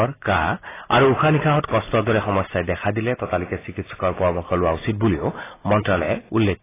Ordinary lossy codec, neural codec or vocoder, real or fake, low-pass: AAC, 16 kbps; none; real; 3.6 kHz